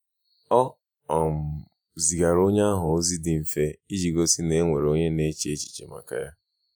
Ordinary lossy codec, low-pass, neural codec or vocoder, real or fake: none; none; vocoder, 48 kHz, 128 mel bands, Vocos; fake